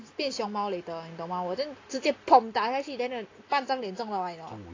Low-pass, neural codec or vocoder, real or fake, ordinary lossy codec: 7.2 kHz; none; real; AAC, 32 kbps